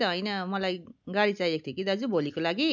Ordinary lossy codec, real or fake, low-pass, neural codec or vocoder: none; real; 7.2 kHz; none